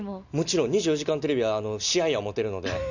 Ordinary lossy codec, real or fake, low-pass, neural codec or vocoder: none; real; 7.2 kHz; none